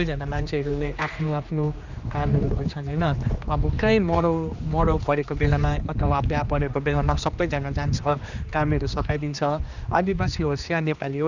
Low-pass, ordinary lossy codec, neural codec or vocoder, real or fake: 7.2 kHz; none; codec, 16 kHz, 2 kbps, X-Codec, HuBERT features, trained on general audio; fake